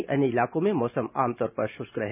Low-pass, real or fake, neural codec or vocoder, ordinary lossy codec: 3.6 kHz; real; none; none